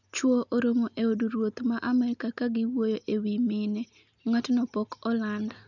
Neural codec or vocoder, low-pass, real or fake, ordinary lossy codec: none; 7.2 kHz; real; none